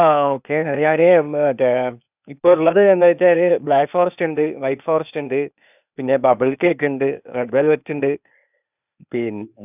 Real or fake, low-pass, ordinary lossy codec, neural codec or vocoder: fake; 3.6 kHz; none; codec, 16 kHz, 0.8 kbps, ZipCodec